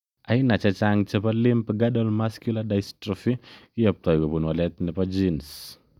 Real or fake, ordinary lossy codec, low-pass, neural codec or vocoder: real; none; 19.8 kHz; none